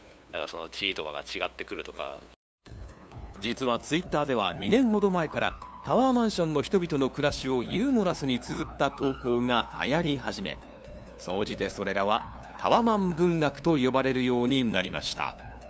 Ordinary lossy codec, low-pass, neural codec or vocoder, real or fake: none; none; codec, 16 kHz, 2 kbps, FunCodec, trained on LibriTTS, 25 frames a second; fake